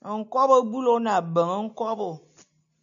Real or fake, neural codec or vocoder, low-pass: real; none; 7.2 kHz